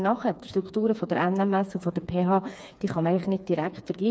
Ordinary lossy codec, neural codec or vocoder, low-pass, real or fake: none; codec, 16 kHz, 4 kbps, FreqCodec, smaller model; none; fake